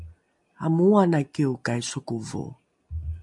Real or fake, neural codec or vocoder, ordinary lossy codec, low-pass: real; none; MP3, 64 kbps; 10.8 kHz